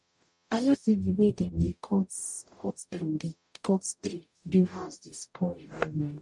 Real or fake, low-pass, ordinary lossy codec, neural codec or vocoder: fake; 10.8 kHz; none; codec, 44.1 kHz, 0.9 kbps, DAC